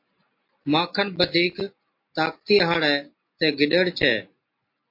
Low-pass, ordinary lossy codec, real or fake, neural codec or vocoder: 5.4 kHz; MP3, 32 kbps; real; none